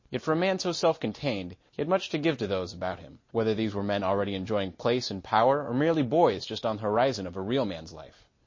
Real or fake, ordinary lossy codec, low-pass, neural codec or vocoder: real; MP3, 32 kbps; 7.2 kHz; none